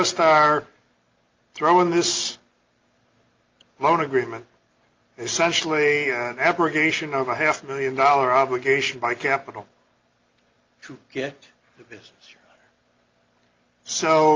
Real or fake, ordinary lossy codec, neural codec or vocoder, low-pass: real; Opus, 24 kbps; none; 7.2 kHz